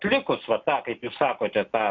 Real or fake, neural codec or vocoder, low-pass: real; none; 7.2 kHz